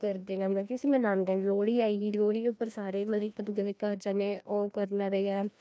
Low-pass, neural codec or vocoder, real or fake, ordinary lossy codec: none; codec, 16 kHz, 1 kbps, FreqCodec, larger model; fake; none